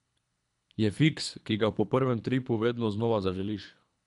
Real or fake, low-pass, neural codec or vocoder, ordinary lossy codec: fake; 10.8 kHz; codec, 24 kHz, 3 kbps, HILCodec; none